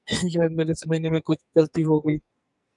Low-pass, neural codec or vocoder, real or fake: 10.8 kHz; codec, 44.1 kHz, 2.6 kbps, SNAC; fake